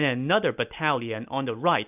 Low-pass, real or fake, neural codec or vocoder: 3.6 kHz; real; none